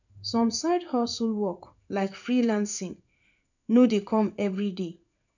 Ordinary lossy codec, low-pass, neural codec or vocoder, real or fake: none; 7.2 kHz; codec, 16 kHz in and 24 kHz out, 1 kbps, XY-Tokenizer; fake